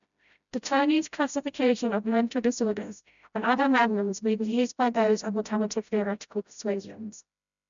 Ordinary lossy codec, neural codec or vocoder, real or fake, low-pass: none; codec, 16 kHz, 0.5 kbps, FreqCodec, smaller model; fake; 7.2 kHz